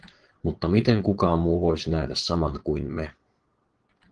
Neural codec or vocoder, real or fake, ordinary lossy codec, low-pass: vocoder, 22.05 kHz, 80 mel bands, Vocos; fake; Opus, 16 kbps; 9.9 kHz